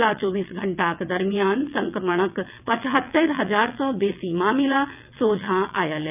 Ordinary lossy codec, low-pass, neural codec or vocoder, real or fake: none; 3.6 kHz; vocoder, 22.05 kHz, 80 mel bands, WaveNeXt; fake